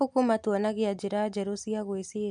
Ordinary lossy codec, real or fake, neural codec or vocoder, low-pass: none; real; none; 10.8 kHz